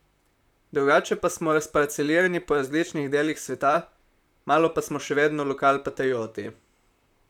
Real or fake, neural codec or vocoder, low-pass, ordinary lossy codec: fake; vocoder, 44.1 kHz, 128 mel bands, Pupu-Vocoder; 19.8 kHz; none